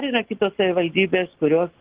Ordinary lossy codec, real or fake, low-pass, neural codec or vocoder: Opus, 16 kbps; real; 3.6 kHz; none